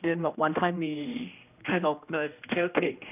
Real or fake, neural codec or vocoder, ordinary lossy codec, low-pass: fake; codec, 16 kHz, 1 kbps, X-Codec, HuBERT features, trained on general audio; none; 3.6 kHz